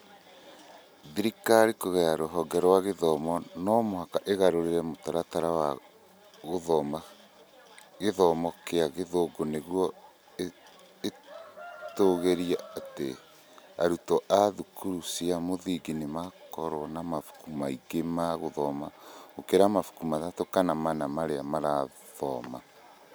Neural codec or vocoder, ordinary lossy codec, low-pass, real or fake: none; none; none; real